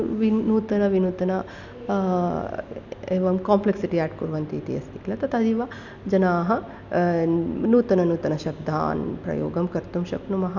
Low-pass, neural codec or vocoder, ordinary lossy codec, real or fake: 7.2 kHz; none; none; real